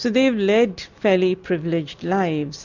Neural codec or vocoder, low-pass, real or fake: none; 7.2 kHz; real